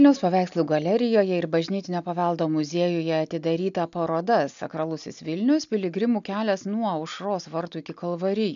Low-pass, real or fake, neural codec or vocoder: 7.2 kHz; real; none